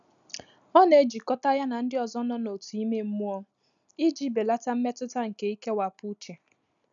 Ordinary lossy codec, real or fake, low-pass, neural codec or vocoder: none; real; 7.2 kHz; none